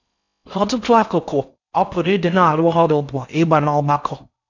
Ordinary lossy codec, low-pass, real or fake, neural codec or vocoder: none; 7.2 kHz; fake; codec, 16 kHz in and 24 kHz out, 0.6 kbps, FocalCodec, streaming, 4096 codes